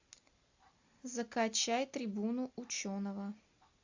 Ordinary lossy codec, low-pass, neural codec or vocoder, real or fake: AAC, 48 kbps; 7.2 kHz; none; real